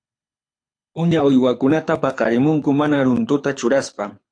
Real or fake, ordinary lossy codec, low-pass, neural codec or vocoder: fake; AAC, 48 kbps; 9.9 kHz; codec, 24 kHz, 6 kbps, HILCodec